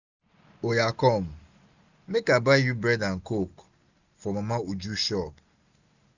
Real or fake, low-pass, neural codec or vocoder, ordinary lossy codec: real; 7.2 kHz; none; none